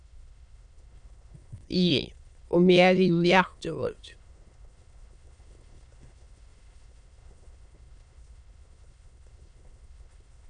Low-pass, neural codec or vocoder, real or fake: 9.9 kHz; autoencoder, 22.05 kHz, a latent of 192 numbers a frame, VITS, trained on many speakers; fake